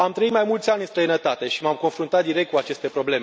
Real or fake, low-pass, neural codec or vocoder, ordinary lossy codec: real; none; none; none